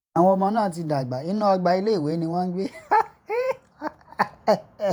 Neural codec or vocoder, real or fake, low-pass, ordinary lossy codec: vocoder, 48 kHz, 128 mel bands, Vocos; fake; 19.8 kHz; none